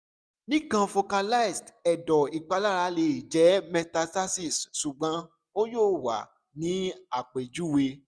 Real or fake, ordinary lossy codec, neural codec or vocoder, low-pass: real; none; none; none